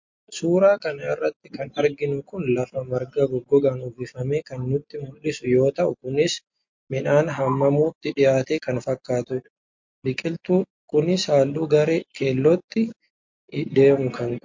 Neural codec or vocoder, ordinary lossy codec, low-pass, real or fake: none; MP3, 48 kbps; 7.2 kHz; real